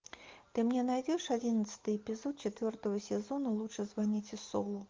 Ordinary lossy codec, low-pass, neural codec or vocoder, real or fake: Opus, 32 kbps; 7.2 kHz; none; real